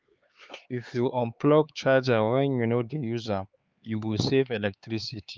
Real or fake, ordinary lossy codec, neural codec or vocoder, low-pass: fake; Opus, 32 kbps; codec, 16 kHz, 4 kbps, X-Codec, HuBERT features, trained on LibriSpeech; 7.2 kHz